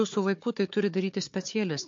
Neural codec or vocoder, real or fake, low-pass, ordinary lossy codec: codec, 16 kHz, 4 kbps, FunCodec, trained on Chinese and English, 50 frames a second; fake; 7.2 kHz; MP3, 48 kbps